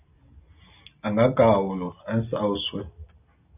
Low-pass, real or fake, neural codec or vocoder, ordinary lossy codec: 3.6 kHz; real; none; AAC, 32 kbps